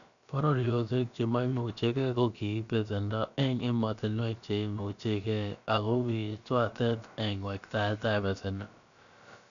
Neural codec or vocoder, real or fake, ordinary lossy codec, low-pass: codec, 16 kHz, about 1 kbps, DyCAST, with the encoder's durations; fake; none; 7.2 kHz